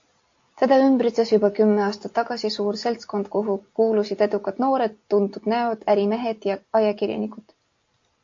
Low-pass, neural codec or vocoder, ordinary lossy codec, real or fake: 7.2 kHz; none; AAC, 48 kbps; real